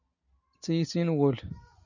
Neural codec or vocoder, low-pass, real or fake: none; 7.2 kHz; real